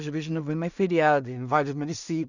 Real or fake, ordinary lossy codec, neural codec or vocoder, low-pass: fake; none; codec, 16 kHz in and 24 kHz out, 0.4 kbps, LongCat-Audio-Codec, two codebook decoder; 7.2 kHz